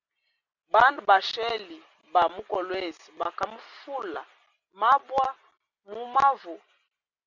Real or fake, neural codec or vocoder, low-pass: real; none; 7.2 kHz